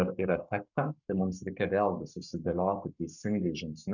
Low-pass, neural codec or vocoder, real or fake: 7.2 kHz; codec, 44.1 kHz, 7.8 kbps, Pupu-Codec; fake